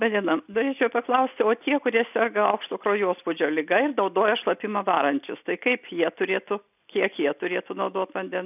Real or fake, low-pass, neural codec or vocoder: real; 3.6 kHz; none